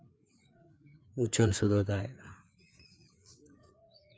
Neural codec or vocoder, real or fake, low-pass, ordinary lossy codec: codec, 16 kHz, 4 kbps, FreqCodec, larger model; fake; none; none